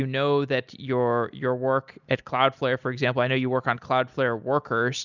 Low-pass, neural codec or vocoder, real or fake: 7.2 kHz; none; real